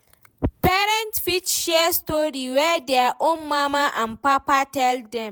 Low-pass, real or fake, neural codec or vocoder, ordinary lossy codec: none; fake; vocoder, 48 kHz, 128 mel bands, Vocos; none